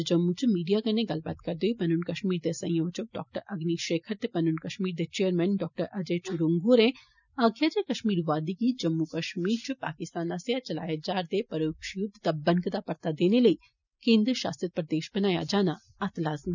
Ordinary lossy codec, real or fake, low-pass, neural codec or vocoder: none; real; 7.2 kHz; none